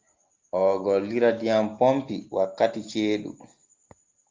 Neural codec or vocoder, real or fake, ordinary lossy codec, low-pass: none; real; Opus, 32 kbps; 7.2 kHz